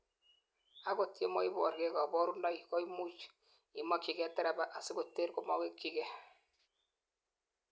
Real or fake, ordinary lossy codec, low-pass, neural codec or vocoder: real; none; none; none